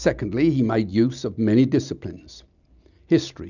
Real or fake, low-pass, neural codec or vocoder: real; 7.2 kHz; none